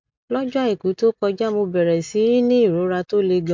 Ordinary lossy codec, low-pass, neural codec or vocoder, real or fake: MP3, 64 kbps; 7.2 kHz; none; real